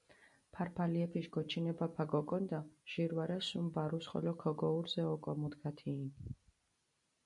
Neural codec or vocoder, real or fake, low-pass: none; real; 10.8 kHz